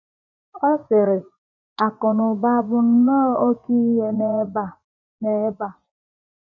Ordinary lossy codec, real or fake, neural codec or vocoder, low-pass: none; fake; vocoder, 24 kHz, 100 mel bands, Vocos; 7.2 kHz